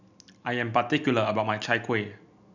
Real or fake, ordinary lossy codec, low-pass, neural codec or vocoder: real; none; 7.2 kHz; none